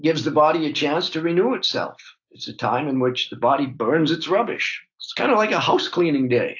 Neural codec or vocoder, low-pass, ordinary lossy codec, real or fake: none; 7.2 kHz; AAC, 48 kbps; real